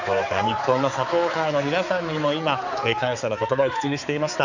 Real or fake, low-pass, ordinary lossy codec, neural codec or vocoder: fake; 7.2 kHz; none; codec, 16 kHz, 4 kbps, X-Codec, HuBERT features, trained on balanced general audio